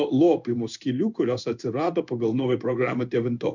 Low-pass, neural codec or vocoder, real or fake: 7.2 kHz; codec, 16 kHz in and 24 kHz out, 1 kbps, XY-Tokenizer; fake